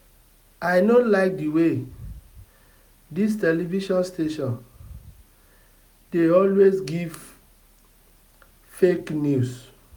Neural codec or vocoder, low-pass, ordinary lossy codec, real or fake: none; 19.8 kHz; none; real